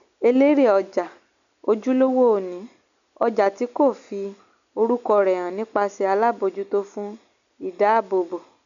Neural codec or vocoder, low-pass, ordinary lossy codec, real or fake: none; 7.2 kHz; none; real